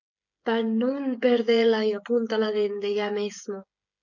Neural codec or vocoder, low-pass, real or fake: codec, 16 kHz, 16 kbps, FreqCodec, smaller model; 7.2 kHz; fake